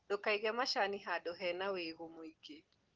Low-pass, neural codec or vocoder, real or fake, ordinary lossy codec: 7.2 kHz; none; real; Opus, 16 kbps